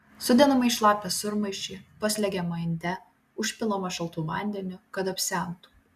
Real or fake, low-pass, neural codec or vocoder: real; 14.4 kHz; none